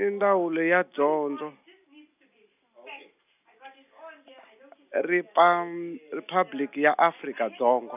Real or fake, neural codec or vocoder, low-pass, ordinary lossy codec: real; none; 3.6 kHz; none